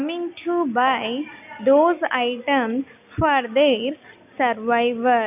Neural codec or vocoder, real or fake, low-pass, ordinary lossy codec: none; real; 3.6 kHz; none